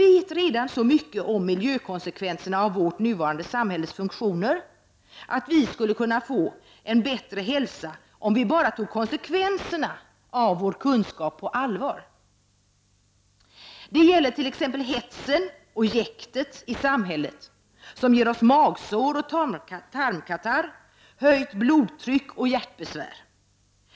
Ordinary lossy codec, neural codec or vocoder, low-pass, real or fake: none; none; none; real